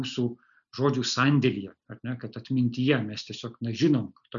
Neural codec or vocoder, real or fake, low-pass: none; real; 7.2 kHz